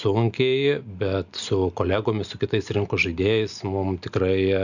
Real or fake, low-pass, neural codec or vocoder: real; 7.2 kHz; none